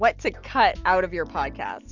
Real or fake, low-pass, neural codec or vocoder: real; 7.2 kHz; none